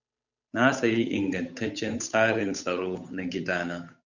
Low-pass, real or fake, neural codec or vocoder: 7.2 kHz; fake; codec, 16 kHz, 8 kbps, FunCodec, trained on Chinese and English, 25 frames a second